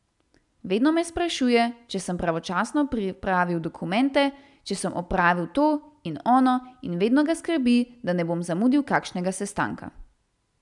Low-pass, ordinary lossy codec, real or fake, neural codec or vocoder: 10.8 kHz; none; real; none